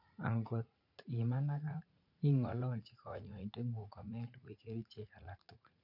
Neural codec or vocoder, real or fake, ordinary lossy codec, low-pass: vocoder, 44.1 kHz, 80 mel bands, Vocos; fake; none; 5.4 kHz